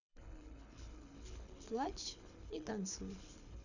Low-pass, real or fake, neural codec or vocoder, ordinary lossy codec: 7.2 kHz; fake; codec, 24 kHz, 6 kbps, HILCodec; none